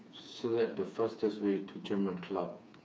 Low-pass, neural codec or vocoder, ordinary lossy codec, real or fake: none; codec, 16 kHz, 4 kbps, FreqCodec, smaller model; none; fake